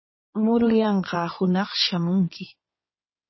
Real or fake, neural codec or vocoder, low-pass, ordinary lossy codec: fake; codec, 16 kHz in and 24 kHz out, 2.2 kbps, FireRedTTS-2 codec; 7.2 kHz; MP3, 24 kbps